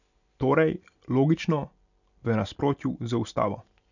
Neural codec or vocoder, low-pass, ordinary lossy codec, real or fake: none; 7.2 kHz; none; real